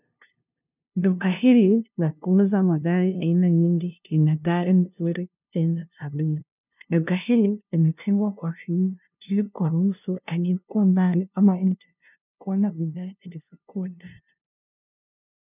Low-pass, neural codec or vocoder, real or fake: 3.6 kHz; codec, 16 kHz, 0.5 kbps, FunCodec, trained on LibriTTS, 25 frames a second; fake